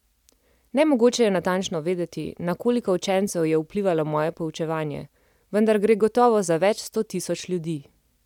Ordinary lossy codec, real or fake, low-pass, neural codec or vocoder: none; real; 19.8 kHz; none